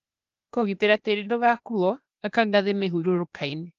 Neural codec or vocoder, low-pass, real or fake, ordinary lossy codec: codec, 16 kHz, 0.8 kbps, ZipCodec; 7.2 kHz; fake; Opus, 32 kbps